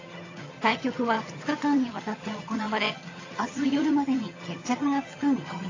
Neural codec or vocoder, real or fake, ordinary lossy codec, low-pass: vocoder, 22.05 kHz, 80 mel bands, HiFi-GAN; fake; AAC, 32 kbps; 7.2 kHz